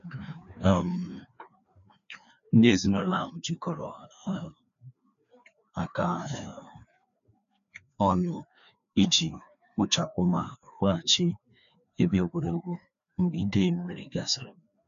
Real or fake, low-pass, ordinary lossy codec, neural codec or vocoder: fake; 7.2 kHz; none; codec, 16 kHz, 2 kbps, FreqCodec, larger model